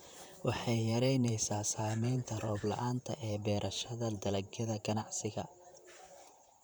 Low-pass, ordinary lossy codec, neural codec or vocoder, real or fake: none; none; vocoder, 44.1 kHz, 128 mel bands, Pupu-Vocoder; fake